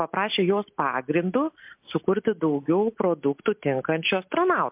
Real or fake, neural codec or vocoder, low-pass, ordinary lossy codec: real; none; 3.6 kHz; MP3, 32 kbps